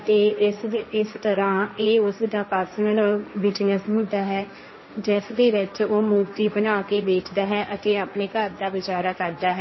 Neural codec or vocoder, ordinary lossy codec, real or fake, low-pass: codec, 16 kHz, 1.1 kbps, Voila-Tokenizer; MP3, 24 kbps; fake; 7.2 kHz